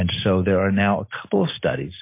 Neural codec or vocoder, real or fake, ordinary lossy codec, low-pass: none; real; MP3, 24 kbps; 3.6 kHz